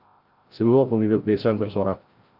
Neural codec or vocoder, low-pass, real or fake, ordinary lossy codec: codec, 16 kHz, 0.5 kbps, FreqCodec, larger model; 5.4 kHz; fake; Opus, 24 kbps